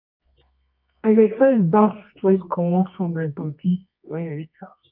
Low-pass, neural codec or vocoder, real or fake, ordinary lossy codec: 5.4 kHz; codec, 24 kHz, 0.9 kbps, WavTokenizer, medium music audio release; fake; none